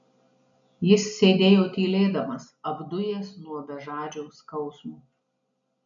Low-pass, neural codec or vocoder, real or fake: 7.2 kHz; none; real